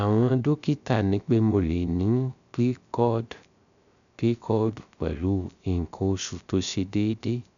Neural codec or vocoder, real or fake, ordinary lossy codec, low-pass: codec, 16 kHz, 0.3 kbps, FocalCodec; fake; none; 7.2 kHz